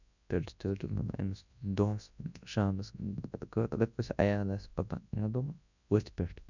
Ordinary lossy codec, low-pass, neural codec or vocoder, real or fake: none; 7.2 kHz; codec, 24 kHz, 0.9 kbps, WavTokenizer, large speech release; fake